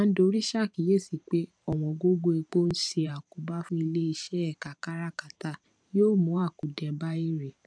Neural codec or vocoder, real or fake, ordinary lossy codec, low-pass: vocoder, 44.1 kHz, 128 mel bands every 512 samples, BigVGAN v2; fake; none; 9.9 kHz